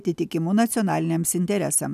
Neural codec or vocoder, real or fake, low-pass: none; real; 14.4 kHz